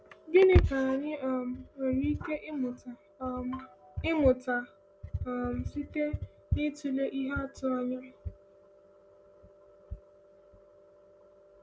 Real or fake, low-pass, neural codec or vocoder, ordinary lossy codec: real; none; none; none